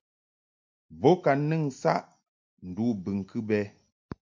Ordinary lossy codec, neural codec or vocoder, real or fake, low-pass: MP3, 64 kbps; none; real; 7.2 kHz